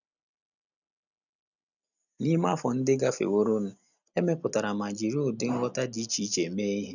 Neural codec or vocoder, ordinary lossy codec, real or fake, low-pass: none; none; real; 7.2 kHz